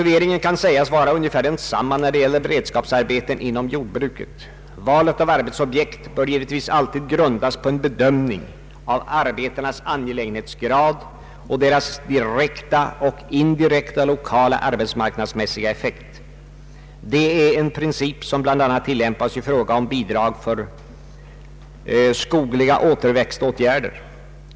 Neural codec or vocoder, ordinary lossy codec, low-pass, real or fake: none; none; none; real